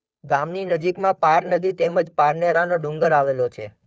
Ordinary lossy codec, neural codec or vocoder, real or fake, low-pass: none; codec, 16 kHz, 2 kbps, FunCodec, trained on Chinese and English, 25 frames a second; fake; none